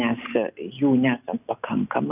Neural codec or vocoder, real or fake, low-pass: none; real; 3.6 kHz